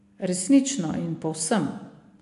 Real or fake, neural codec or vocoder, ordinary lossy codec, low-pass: real; none; AAC, 64 kbps; 10.8 kHz